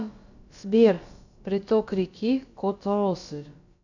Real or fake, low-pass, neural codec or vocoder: fake; 7.2 kHz; codec, 16 kHz, about 1 kbps, DyCAST, with the encoder's durations